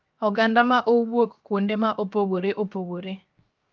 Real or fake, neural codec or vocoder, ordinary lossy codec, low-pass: fake; codec, 16 kHz, 0.7 kbps, FocalCodec; Opus, 24 kbps; 7.2 kHz